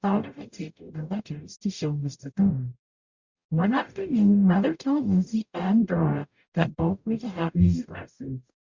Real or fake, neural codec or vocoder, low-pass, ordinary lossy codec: fake; codec, 44.1 kHz, 0.9 kbps, DAC; 7.2 kHz; Opus, 64 kbps